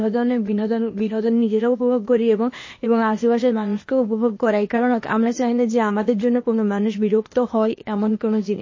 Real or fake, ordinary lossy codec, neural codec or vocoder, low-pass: fake; MP3, 32 kbps; codec, 16 kHz, 0.8 kbps, ZipCodec; 7.2 kHz